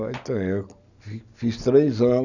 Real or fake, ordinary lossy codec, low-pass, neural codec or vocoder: real; none; 7.2 kHz; none